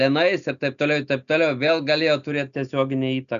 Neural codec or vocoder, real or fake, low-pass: none; real; 7.2 kHz